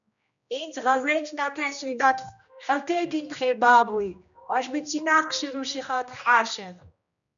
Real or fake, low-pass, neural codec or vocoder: fake; 7.2 kHz; codec, 16 kHz, 1 kbps, X-Codec, HuBERT features, trained on general audio